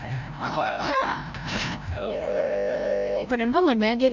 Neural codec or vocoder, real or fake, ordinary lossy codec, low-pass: codec, 16 kHz, 0.5 kbps, FreqCodec, larger model; fake; none; 7.2 kHz